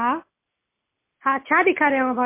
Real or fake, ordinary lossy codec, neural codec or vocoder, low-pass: real; MP3, 24 kbps; none; 3.6 kHz